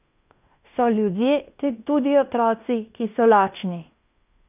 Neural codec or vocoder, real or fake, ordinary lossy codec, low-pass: codec, 16 kHz in and 24 kHz out, 0.9 kbps, LongCat-Audio-Codec, fine tuned four codebook decoder; fake; none; 3.6 kHz